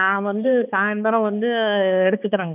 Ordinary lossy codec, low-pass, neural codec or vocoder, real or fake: none; 3.6 kHz; codec, 16 kHz, 2 kbps, X-Codec, HuBERT features, trained on balanced general audio; fake